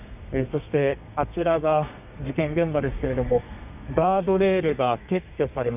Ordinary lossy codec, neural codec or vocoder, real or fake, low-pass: none; codec, 32 kHz, 1.9 kbps, SNAC; fake; 3.6 kHz